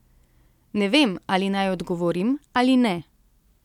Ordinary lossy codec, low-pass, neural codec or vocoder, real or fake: none; 19.8 kHz; none; real